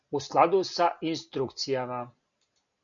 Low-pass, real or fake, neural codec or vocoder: 7.2 kHz; real; none